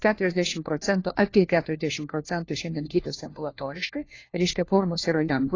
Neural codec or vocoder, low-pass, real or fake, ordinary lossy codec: codec, 16 kHz, 1 kbps, FunCodec, trained on LibriTTS, 50 frames a second; 7.2 kHz; fake; AAC, 32 kbps